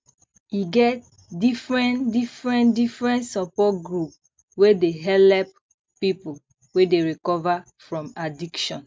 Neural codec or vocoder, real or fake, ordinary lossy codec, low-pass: none; real; none; none